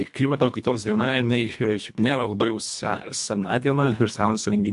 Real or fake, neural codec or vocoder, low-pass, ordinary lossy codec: fake; codec, 24 kHz, 1.5 kbps, HILCodec; 10.8 kHz; MP3, 64 kbps